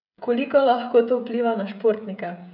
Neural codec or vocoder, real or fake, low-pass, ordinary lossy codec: codec, 16 kHz, 16 kbps, FreqCodec, smaller model; fake; 5.4 kHz; none